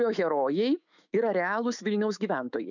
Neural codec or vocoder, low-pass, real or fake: autoencoder, 48 kHz, 128 numbers a frame, DAC-VAE, trained on Japanese speech; 7.2 kHz; fake